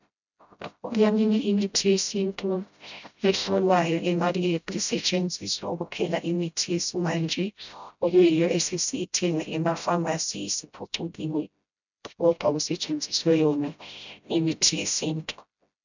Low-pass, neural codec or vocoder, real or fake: 7.2 kHz; codec, 16 kHz, 0.5 kbps, FreqCodec, smaller model; fake